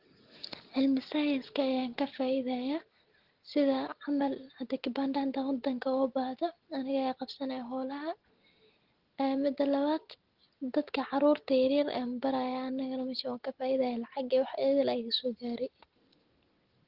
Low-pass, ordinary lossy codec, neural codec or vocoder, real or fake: 5.4 kHz; Opus, 16 kbps; none; real